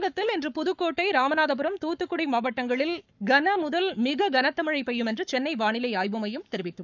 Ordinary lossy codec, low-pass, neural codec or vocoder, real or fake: none; 7.2 kHz; codec, 44.1 kHz, 7.8 kbps, Pupu-Codec; fake